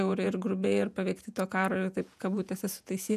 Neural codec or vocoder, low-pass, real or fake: vocoder, 48 kHz, 128 mel bands, Vocos; 14.4 kHz; fake